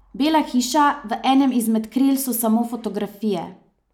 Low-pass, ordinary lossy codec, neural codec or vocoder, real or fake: 19.8 kHz; none; none; real